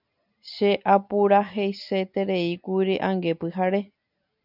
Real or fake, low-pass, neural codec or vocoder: real; 5.4 kHz; none